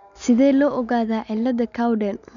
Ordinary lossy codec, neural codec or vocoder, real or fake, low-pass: MP3, 96 kbps; none; real; 7.2 kHz